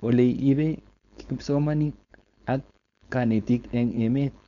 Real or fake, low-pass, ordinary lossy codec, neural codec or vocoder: fake; 7.2 kHz; none; codec, 16 kHz, 4.8 kbps, FACodec